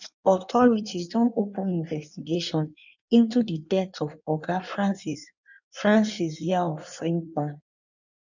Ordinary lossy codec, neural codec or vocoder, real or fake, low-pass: none; codec, 16 kHz in and 24 kHz out, 1.1 kbps, FireRedTTS-2 codec; fake; 7.2 kHz